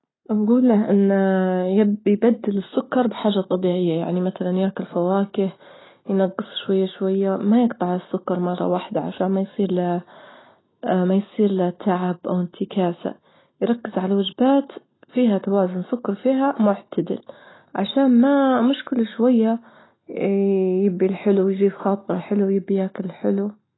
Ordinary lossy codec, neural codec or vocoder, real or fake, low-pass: AAC, 16 kbps; none; real; 7.2 kHz